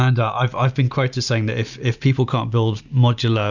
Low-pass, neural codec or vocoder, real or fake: 7.2 kHz; none; real